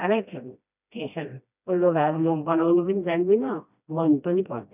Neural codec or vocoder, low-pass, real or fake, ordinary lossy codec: codec, 16 kHz, 1 kbps, FreqCodec, smaller model; 3.6 kHz; fake; none